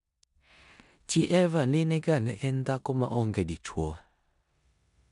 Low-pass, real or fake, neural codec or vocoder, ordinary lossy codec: 10.8 kHz; fake; codec, 16 kHz in and 24 kHz out, 0.9 kbps, LongCat-Audio-Codec, four codebook decoder; MP3, 96 kbps